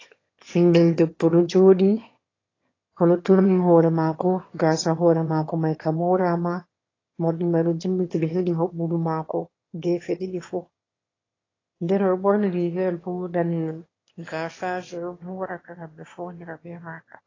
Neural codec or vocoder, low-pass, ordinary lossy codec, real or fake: autoencoder, 22.05 kHz, a latent of 192 numbers a frame, VITS, trained on one speaker; 7.2 kHz; AAC, 32 kbps; fake